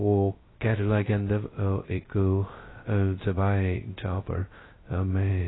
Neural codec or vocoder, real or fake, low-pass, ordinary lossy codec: codec, 16 kHz, 0.2 kbps, FocalCodec; fake; 7.2 kHz; AAC, 16 kbps